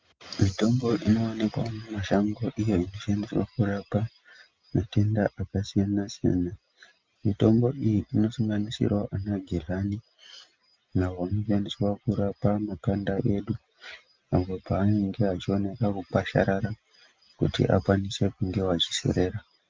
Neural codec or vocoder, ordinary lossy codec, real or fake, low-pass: none; Opus, 24 kbps; real; 7.2 kHz